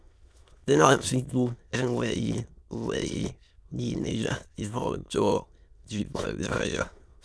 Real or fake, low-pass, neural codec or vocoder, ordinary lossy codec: fake; none; autoencoder, 22.05 kHz, a latent of 192 numbers a frame, VITS, trained on many speakers; none